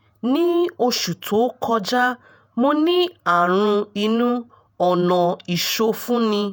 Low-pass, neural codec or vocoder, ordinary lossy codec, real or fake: none; vocoder, 48 kHz, 128 mel bands, Vocos; none; fake